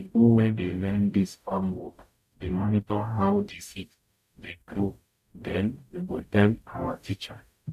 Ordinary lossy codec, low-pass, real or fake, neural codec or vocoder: none; 14.4 kHz; fake; codec, 44.1 kHz, 0.9 kbps, DAC